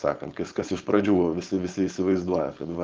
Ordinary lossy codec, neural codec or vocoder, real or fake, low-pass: Opus, 24 kbps; codec, 16 kHz, 4.8 kbps, FACodec; fake; 7.2 kHz